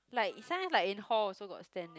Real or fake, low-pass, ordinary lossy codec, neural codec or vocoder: real; none; none; none